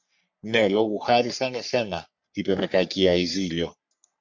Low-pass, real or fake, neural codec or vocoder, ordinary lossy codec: 7.2 kHz; fake; codec, 44.1 kHz, 3.4 kbps, Pupu-Codec; MP3, 64 kbps